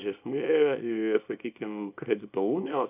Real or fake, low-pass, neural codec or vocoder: fake; 3.6 kHz; codec, 24 kHz, 0.9 kbps, WavTokenizer, small release